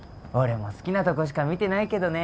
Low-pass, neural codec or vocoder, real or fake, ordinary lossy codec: none; none; real; none